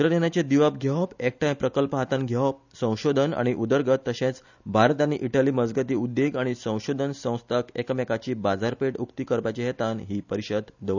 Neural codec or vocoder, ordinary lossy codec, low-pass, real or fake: none; none; 7.2 kHz; real